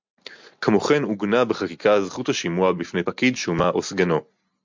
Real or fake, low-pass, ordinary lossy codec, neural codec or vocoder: real; 7.2 kHz; MP3, 64 kbps; none